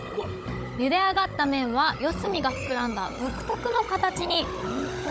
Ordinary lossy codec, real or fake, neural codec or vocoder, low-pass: none; fake; codec, 16 kHz, 16 kbps, FunCodec, trained on Chinese and English, 50 frames a second; none